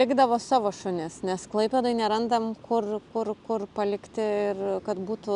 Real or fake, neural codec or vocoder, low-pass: real; none; 10.8 kHz